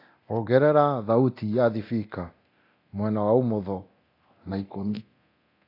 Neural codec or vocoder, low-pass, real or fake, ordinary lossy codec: codec, 24 kHz, 0.9 kbps, DualCodec; 5.4 kHz; fake; AAC, 32 kbps